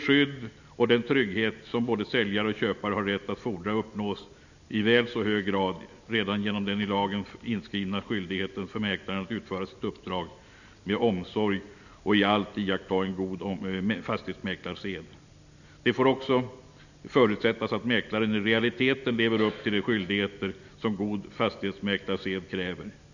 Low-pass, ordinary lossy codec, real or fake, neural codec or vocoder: 7.2 kHz; none; real; none